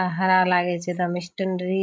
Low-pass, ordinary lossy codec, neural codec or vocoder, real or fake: none; none; codec, 16 kHz, 16 kbps, FreqCodec, larger model; fake